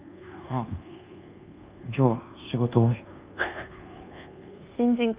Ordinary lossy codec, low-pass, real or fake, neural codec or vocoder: Opus, 24 kbps; 3.6 kHz; fake; codec, 24 kHz, 1.2 kbps, DualCodec